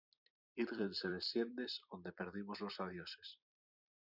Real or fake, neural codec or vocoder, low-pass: fake; vocoder, 44.1 kHz, 128 mel bands every 256 samples, BigVGAN v2; 5.4 kHz